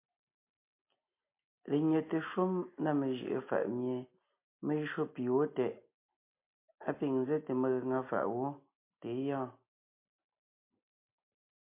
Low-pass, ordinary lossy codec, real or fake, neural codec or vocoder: 3.6 kHz; MP3, 24 kbps; real; none